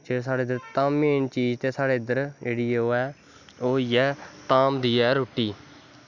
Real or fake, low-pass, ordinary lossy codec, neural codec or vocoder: real; 7.2 kHz; none; none